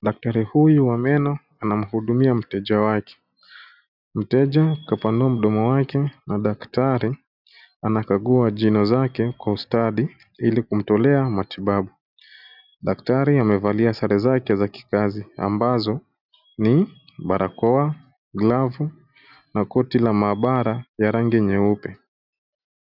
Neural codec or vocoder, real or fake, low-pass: none; real; 5.4 kHz